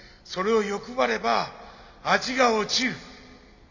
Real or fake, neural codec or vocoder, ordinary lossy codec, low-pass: real; none; Opus, 64 kbps; 7.2 kHz